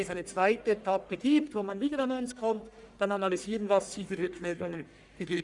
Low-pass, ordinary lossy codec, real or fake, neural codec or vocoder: 10.8 kHz; none; fake; codec, 44.1 kHz, 1.7 kbps, Pupu-Codec